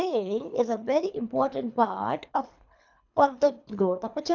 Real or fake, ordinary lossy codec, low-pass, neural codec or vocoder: fake; none; 7.2 kHz; codec, 24 kHz, 3 kbps, HILCodec